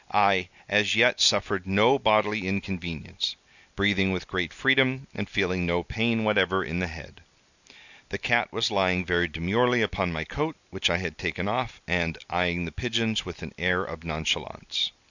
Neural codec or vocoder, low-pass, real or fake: none; 7.2 kHz; real